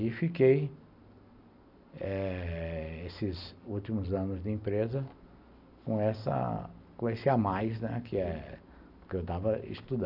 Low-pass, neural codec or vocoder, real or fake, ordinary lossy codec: 5.4 kHz; none; real; none